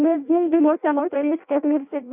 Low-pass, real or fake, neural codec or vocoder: 3.6 kHz; fake; codec, 16 kHz in and 24 kHz out, 0.6 kbps, FireRedTTS-2 codec